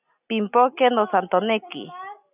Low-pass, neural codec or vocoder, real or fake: 3.6 kHz; none; real